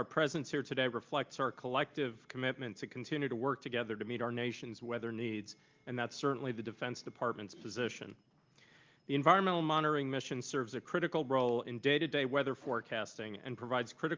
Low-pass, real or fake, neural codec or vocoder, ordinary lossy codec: 7.2 kHz; real; none; Opus, 32 kbps